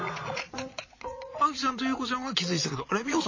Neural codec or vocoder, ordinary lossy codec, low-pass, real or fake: vocoder, 22.05 kHz, 80 mel bands, Vocos; MP3, 32 kbps; 7.2 kHz; fake